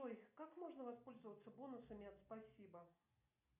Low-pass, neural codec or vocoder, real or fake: 3.6 kHz; none; real